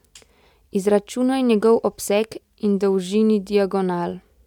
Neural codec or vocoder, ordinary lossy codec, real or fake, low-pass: none; none; real; 19.8 kHz